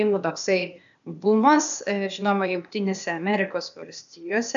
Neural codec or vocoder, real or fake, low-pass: codec, 16 kHz, 0.8 kbps, ZipCodec; fake; 7.2 kHz